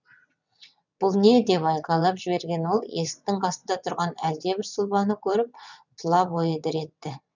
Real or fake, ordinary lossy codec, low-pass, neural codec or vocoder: fake; none; 7.2 kHz; vocoder, 22.05 kHz, 80 mel bands, WaveNeXt